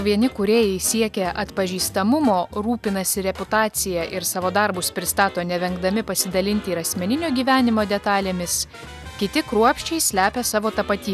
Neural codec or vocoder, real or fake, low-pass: none; real; 14.4 kHz